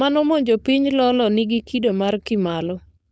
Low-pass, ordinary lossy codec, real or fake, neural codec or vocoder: none; none; fake; codec, 16 kHz, 4.8 kbps, FACodec